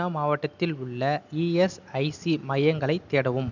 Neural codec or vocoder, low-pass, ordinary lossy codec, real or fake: none; 7.2 kHz; none; real